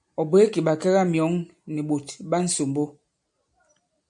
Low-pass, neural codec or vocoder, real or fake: 9.9 kHz; none; real